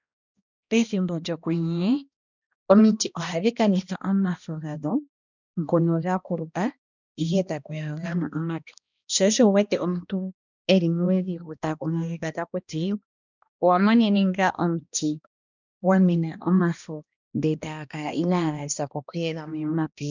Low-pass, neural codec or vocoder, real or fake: 7.2 kHz; codec, 16 kHz, 1 kbps, X-Codec, HuBERT features, trained on balanced general audio; fake